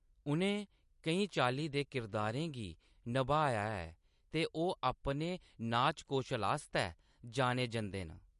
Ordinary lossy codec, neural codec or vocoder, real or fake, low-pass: MP3, 48 kbps; none; real; 14.4 kHz